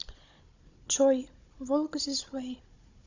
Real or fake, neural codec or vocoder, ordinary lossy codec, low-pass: fake; codec, 16 kHz, 16 kbps, FunCodec, trained on Chinese and English, 50 frames a second; Opus, 64 kbps; 7.2 kHz